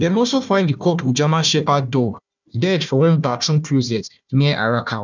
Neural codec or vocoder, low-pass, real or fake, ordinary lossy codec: codec, 16 kHz, 1 kbps, FunCodec, trained on Chinese and English, 50 frames a second; 7.2 kHz; fake; none